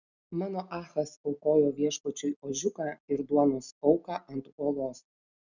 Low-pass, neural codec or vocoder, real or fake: 7.2 kHz; none; real